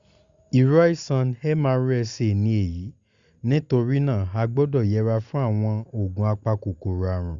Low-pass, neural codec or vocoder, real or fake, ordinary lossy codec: 7.2 kHz; none; real; Opus, 64 kbps